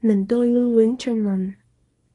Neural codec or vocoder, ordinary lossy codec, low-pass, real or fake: codec, 24 kHz, 0.9 kbps, WavTokenizer, small release; AAC, 32 kbps; 10.8 kHz; fake